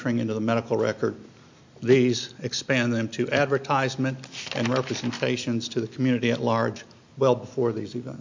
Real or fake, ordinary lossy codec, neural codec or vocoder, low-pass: real; MP3, 48 kbps; none; 7.2 kHz